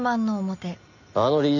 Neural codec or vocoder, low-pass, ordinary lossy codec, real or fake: none; 7.2 kHz; none; real